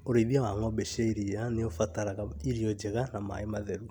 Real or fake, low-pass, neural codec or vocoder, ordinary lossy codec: real; 19.8 kHz; none; none